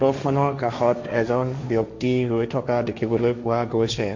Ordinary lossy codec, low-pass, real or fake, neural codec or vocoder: MP3, 64 kbps; 7.2 kHz; fake; codec, 16 kHz, 1.1 kbps, Voila-Tokenizer